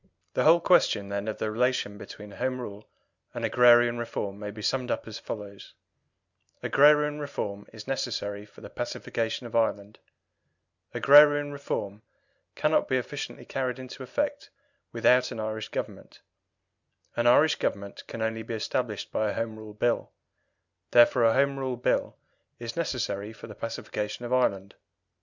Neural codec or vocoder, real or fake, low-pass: none; real; 7.2 kHz